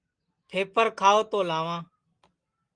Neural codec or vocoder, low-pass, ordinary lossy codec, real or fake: none; 9.9 kHz; Opus, 24 kbps; real